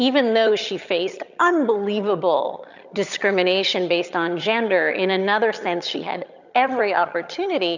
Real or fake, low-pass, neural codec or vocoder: fake; 7.2 kHz; vocoder, 22.05 kHz, 80 mel bands, HiFi-GAN